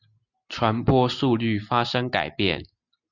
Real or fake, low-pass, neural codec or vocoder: real; 7.2 kHz; none